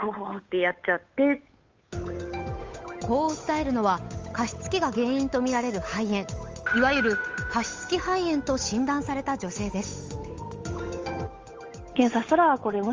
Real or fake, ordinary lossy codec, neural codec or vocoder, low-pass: fake; Opus, 32 kbps; codec, 16 kHz, 8 kbps, FunCodec, trained on Chinese and English, 25 frames a second; 7.2 kHz